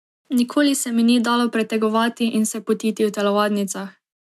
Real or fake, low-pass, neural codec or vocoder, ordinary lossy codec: real; 14.4 kHz; none; MP3, 96 kbps